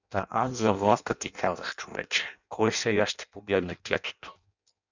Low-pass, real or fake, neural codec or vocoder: 7.2 kHz; fake; codec, 16 kHz in and 24 kHz out, 0.6 kbps, FireRedTTS-2 codec